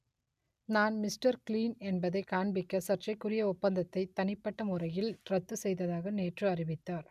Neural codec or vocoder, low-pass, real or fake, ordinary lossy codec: none; 14.4 kHz; real; none